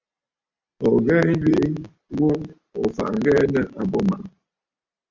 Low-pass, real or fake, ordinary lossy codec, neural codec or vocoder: 7.2 kHz; real; Opus, 64 kbps; none